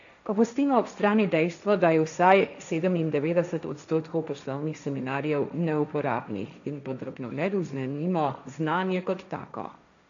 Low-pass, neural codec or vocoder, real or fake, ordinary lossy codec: 7.2 kHz; codec, 16 kHz, 1.1 kbps, Voila-Tokenizer; fake; none